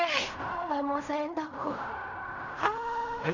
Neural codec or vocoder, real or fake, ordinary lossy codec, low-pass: codec, 16 kHz in and 24 kHz out, 0.4 kbps, LongCat-Audio-Codec, fine tuned four codebook decoder; fake; none; 7.2 kHz